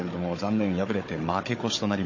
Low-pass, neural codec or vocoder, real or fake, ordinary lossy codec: 7.2 kHz; codec, 16 kHz, 16 kbps, FunCodec, trained on Chinese and English, 50 frames a second; fake; MP3, 32 kbps